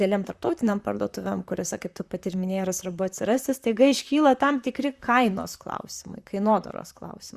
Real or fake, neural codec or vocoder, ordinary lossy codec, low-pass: fake; vocoder, 44.1 kHz, 128 mel bands, Pupu-Vocoder; Opus, 64 kbps; 14.4 kHz